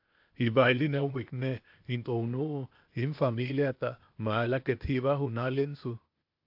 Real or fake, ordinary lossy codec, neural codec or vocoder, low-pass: fake; AAC, 48 kbps; codec, 16 kHz, 0.8 kbps, ZipCodec; 5.4 kHz